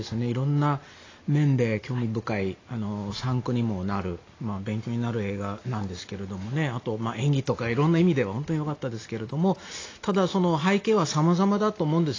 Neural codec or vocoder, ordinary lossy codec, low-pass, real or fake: none; AAC, 32 kbps; 7.2 kHz; real